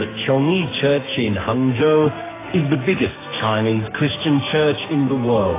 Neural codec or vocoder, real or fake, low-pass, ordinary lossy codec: codec, 44.1 kHz, 2.6 kbps, SNAC; fake; 3.6 kHz; AAC, 16 kbps